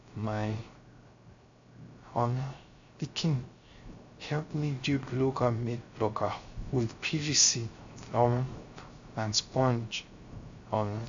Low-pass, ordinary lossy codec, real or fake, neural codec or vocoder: 7.2 kHz; AAC, 64 kbps; fake; codec, 16 kHz, 0.3 kbps, FocalCodec